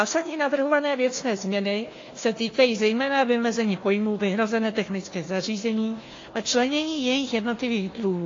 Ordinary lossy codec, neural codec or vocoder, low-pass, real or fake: AAC, 32 kbps; codec, 16 kHz, 1 kbps, FunCodec, trained on Chinese and English, 50 frames a second; 7.2 kHz; fake